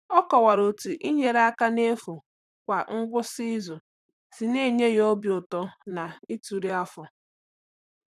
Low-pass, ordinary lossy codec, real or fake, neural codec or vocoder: 14.4 kHz; none; fake; vocoder, 44.1 kHz, 128 mel bands, Pupu-Vocoder